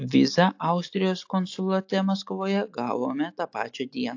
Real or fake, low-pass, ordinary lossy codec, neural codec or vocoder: real; 7.2 kHz; AAC, 48 kbps; none